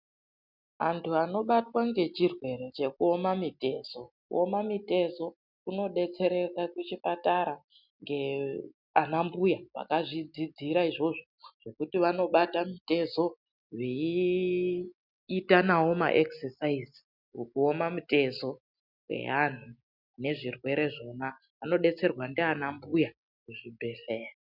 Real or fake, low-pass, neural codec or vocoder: real; 5.4 kHz; none